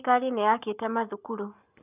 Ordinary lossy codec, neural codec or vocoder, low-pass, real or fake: none; codec, 44.1 kHz, 7.8 kbps, Pupu-Codec; 3.6 kHz; fake